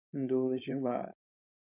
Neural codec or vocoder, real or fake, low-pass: codec, 16 kHz, 4.8 kbps, FACodec; fake; 3.6 kHz